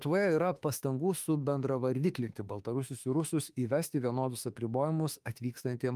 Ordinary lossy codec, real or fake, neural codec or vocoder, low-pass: Opus, 32 kbps; fake; autoencoder, 48 kHz, 32 numbers a frame, DAC-VAE, trained on Japanese speech; 14.4 kHz